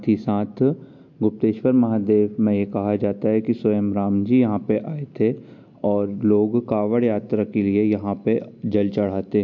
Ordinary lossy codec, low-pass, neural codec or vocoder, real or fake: MP3, 48 kbps; 7.2 kHz; none; real